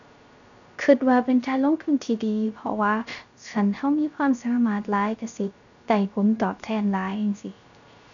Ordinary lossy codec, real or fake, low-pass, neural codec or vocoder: none; fake; 7.2 kHz; codec, 16 kHz, 0.3 kbps, FocalCodec